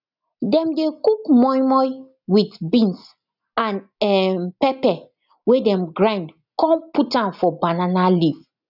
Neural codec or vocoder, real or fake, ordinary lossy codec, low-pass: none; real; none; 5.4 kHz